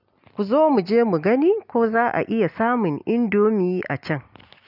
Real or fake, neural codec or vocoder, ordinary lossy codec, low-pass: real; none; none; 5.4 kHz